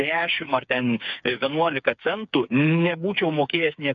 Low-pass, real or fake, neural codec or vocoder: 7.2 kHz; fake; codec, 16 kHz, 4 kbps, FreqCodec, smaller model